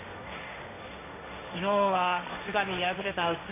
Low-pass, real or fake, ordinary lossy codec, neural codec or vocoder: 3.6 kHz; fake; AAC, 24 kbps; codec, 16 kHz, 1.1 kbps, Voila-Tokenizer